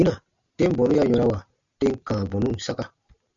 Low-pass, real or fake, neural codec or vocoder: 7.2 kHz; real; none